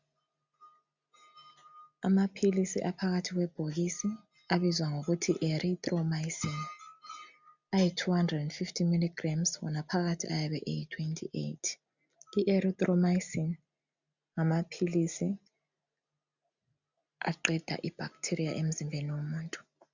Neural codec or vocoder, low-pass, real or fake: none; 7.2 kHz; real